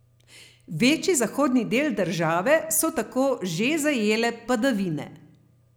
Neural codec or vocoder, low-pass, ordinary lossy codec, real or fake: none; none; none; real